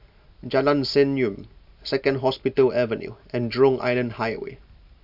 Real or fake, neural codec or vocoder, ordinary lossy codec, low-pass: real; none; AAC, 48 kbps; 5.4 kHz